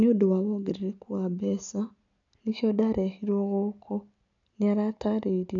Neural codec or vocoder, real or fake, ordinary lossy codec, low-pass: none; real; none; 7.2 kHz